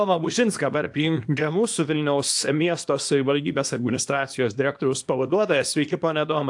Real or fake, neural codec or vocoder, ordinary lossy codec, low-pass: fake; codec, 24 kHz, 0.9 kbps, WavTokenizer, small release; AAC, 64 kbps; 10.8 kHz